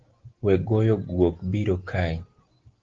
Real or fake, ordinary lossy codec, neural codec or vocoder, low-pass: real; Opus, 16 kbps; none; 7.2 kHz